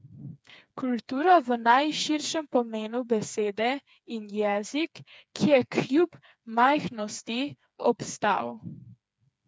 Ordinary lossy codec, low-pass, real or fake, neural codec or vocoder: none; none; fake; codec, 16 kHz, 4 kbps, FreqCodec, smaller model